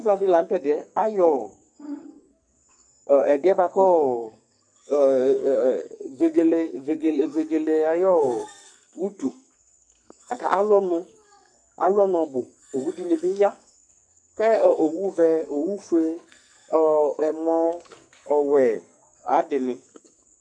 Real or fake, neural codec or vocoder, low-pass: fake; codec, 44.1 kHz, 2.6 kbps, SNAC; 9.9 kHz